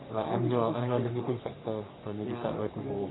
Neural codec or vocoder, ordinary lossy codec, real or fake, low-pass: codec, 44.1 kHz, 3.4 kbps, Pupu-Codec; AAC, 16 kbps; fake; 7.2 kHz